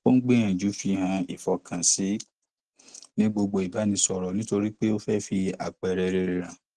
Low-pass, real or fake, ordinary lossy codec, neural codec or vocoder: 10.8 kHz; real; Opus, 16 kbps; none